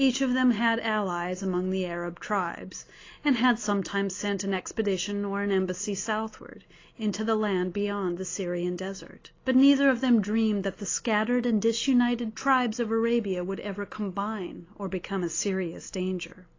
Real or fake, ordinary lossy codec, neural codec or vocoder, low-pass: real; AAC, 32 kbps; none; 7.2 kHz